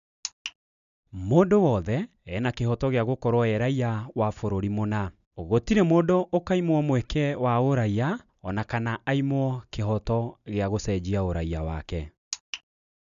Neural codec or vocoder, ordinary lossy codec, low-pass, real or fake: none; none; 7.2 kHz; real